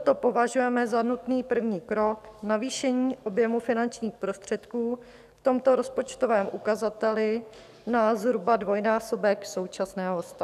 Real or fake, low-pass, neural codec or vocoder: fake; 14.4 kHz; codec, 44.1 kHz, 7.8 kbps, DAC